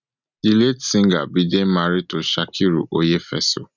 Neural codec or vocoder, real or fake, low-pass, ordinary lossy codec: none; real; 7.2 kHz; none